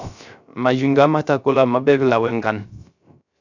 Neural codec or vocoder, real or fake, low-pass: codec, 16 kHz, 0.3 kbps, FocalCodec; fake; 7.2 kHz